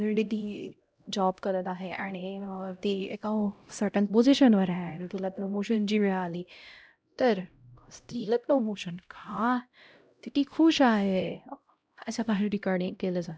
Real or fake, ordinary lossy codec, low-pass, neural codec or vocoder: fake; none; none; codec, 16 kHz, 0.5 kbps, X-Codec, HuBERT features, trained on LibriSpeech